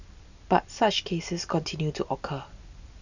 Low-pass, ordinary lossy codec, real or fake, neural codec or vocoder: 7.2 kHz; none; real; none